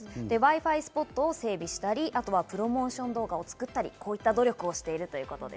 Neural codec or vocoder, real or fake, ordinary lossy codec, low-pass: none; real; none; none